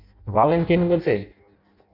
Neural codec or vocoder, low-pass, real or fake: codec, 16 kHz in and 24 kHz out, 0.6 kbps, FireRedTTS-2 codec; 5.4 kHz; fake